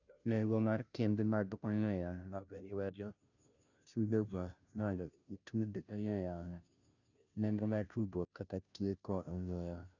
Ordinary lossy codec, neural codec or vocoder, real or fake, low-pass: none; codec, 16 kHz, 0.5 kbps, FunCodec, trained on Chinese and English, 25 frames a second; fake; 7.2 kHz